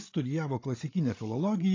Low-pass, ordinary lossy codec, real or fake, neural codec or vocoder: 7.2 kHz; AAC, 32 kbps; fake; codec, 16 kHz, 16 kbps, FreqCodec, larger model